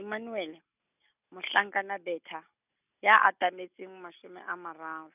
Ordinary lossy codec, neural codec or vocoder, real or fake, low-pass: none; none; real; 3.6 kHz